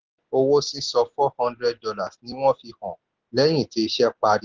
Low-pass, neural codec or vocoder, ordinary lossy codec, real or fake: 7.2 kHz; none; Opus, 16 kbps; real